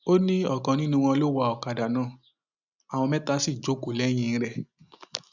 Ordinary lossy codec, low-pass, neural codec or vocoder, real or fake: none; 7.2 kHz; none; real